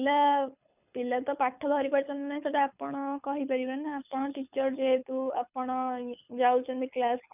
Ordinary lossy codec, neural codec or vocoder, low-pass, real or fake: none; codec, 24 kHz, 6 kbps, HILCodec; 3.6 kHz; fake